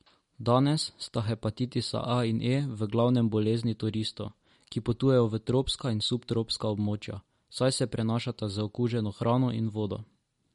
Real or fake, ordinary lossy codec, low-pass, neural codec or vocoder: real; MP3, 48 kbps; 19.8 kHz; none